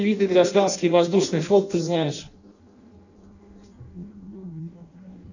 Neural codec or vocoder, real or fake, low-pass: codec, 16 kHz in and 24 kHz out, 0.6 kbps, FireRedTTS-2 codec; fake; 7.2 kHz